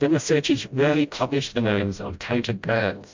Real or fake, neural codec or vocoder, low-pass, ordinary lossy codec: fake; codec, 16 kHz, 0.5 kbps, FreqCodec, smaller model; 7.2 kHz; MP3, 64 kbps